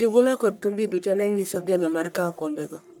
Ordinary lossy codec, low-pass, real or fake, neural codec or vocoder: none; none; fake; codec, 44.1 kHz, 1.7 kbps, Pupu-Codec